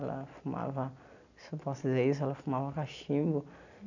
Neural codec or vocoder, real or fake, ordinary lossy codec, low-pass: none; real; none; 7.2 kHz